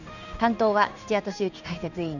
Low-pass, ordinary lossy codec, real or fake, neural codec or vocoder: 7.2 kHz; none; fake; codec, 16 kHz in and 24 kHz out, 1 kbps, XY-Tokenizer